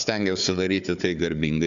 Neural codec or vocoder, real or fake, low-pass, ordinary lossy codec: codec, 16 kHz, 4 kbps, FunCodec, trained on Chinese and English, 50 frames a second; fake; 7.2 kHz; AAC, 96 kbps